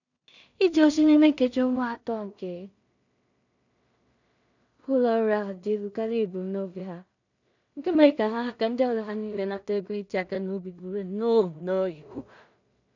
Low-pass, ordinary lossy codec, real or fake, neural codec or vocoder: 7.2 kHz; none; fake; codec, 16 kHz in and 24 kHz out, 0.4 kbps, LongCat-Audio-Codec, two codebook decoder